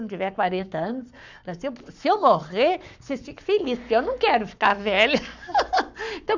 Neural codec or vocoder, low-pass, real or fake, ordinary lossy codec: codec, 44.1 kHz, 7.8 kbps, Pupu-Codec; 7.2 kHz; fake; none